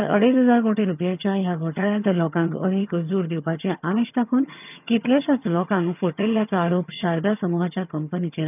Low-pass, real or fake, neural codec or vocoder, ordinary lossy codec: 3.6 kHz; fake; vocoder, 22.05 kHz, 80 mel bands, HiFi-GAN; none